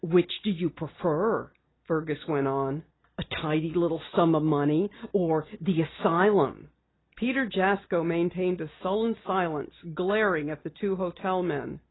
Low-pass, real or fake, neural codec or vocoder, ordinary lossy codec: 7.2 kHz; real; none; AAC, 16 kbps